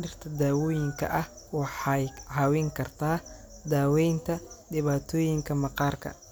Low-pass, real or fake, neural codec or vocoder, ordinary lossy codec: none; real; none; none